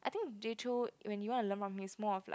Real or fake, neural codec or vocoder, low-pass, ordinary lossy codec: real; none; none; none